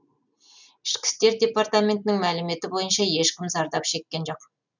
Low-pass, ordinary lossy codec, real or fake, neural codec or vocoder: 7.2 kHz; none; real; none